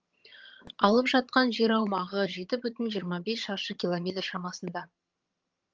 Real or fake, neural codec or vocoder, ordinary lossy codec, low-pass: fake; vocoder, 22.05 kHz, 80 mel bands, HiFi-GAN; Opus, 24 kbps; 7.2 kHz